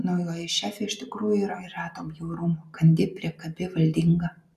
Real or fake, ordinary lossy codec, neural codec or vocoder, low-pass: real; MP3, 96 kbps; none; 14.4 kHz